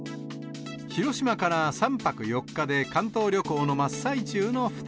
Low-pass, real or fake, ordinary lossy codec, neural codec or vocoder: none; real; none; none